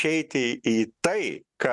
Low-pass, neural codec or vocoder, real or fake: 10.8 kHz; none; real